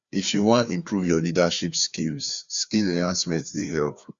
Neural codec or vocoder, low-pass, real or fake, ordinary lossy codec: codec, 16 kHz, 2 kbps, FreqCodec, larger model; 7.2 kHz; fake; Opus, 64 kbps